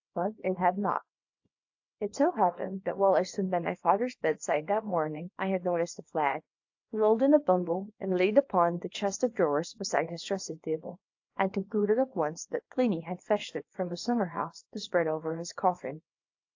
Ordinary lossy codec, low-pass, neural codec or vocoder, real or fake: AAC, 48 kbps; 7.2 kHz; codec, 24 kHz, 0.9 kbps, WavTokenizer, small release; fake